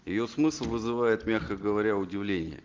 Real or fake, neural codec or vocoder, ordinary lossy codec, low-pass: real; none; Opus, 16 kbps; 7.2 kHz